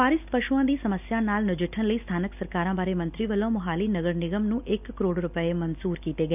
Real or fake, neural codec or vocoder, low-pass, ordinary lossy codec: real; none; 3.6 kHz; none